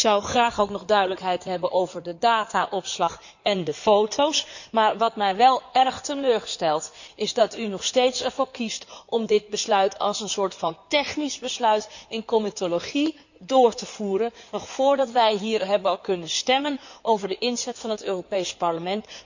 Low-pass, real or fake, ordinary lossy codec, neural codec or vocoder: 7.2 kHz; fake; none; codec, 16 kHz in and 24 kHz out, 2.2 kbps, FireRedTTS-2 codec